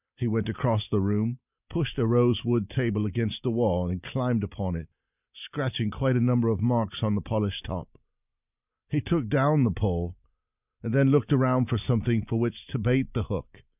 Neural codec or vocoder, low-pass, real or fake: none; 3.6 kHz; real